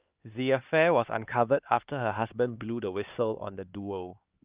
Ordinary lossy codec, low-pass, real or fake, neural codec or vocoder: Opus, 24 kbps; 3.6 kHz; fake; codec, 16 kHz, 2 kbps, X-Codec, HuBERT features, trained on LibriSpeech